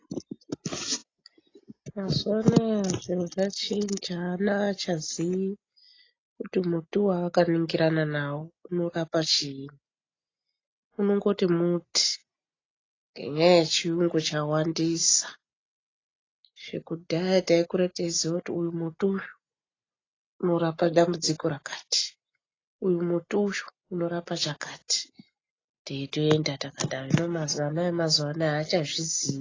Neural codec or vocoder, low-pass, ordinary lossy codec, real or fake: none; 7.2 kHz; AAC, 32 kbps; real